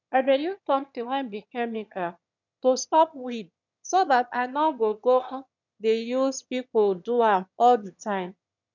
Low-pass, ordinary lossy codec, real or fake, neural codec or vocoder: 7.2 kHz; none; fake; autoencoder, 22.05 kHz, a latent of 192 numbers a frame, VITS, trained on one speaker